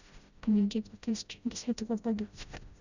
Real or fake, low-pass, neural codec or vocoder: fake; 7.2 kHz; codec, 16 kHz, 0.5 kbps, FreqCodec, smaller model